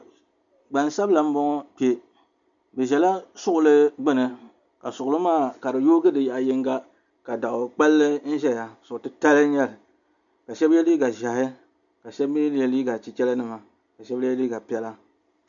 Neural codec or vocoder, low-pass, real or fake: none; 7.2 kHz; real